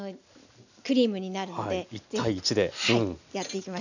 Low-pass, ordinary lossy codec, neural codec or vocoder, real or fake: 7.2 kHz; none; none; real